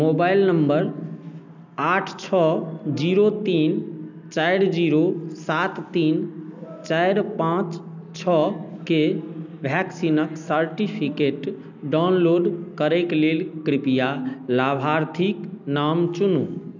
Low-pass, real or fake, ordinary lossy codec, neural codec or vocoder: 7.2 kHz; real; none; none